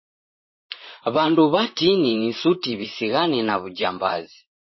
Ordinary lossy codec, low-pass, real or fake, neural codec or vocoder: MP3, 24 kbps; 7.2 kHz; fake; vocoder, 44.1 kHz, 128 mel bands, Pupu-Vocoder